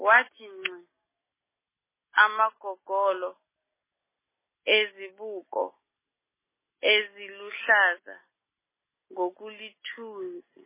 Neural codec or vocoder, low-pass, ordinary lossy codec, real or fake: none; 3.6 kHz; MP3, 16 kbps; real